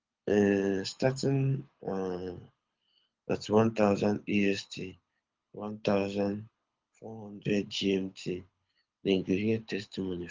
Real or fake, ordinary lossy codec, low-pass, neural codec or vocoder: fake; Opus, 32 kbps; 7.2 kHz; codec, 24 kHz, 6 kbps, HILCodec